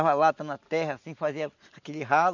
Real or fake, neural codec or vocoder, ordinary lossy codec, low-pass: real; none; none; 7.2 kHz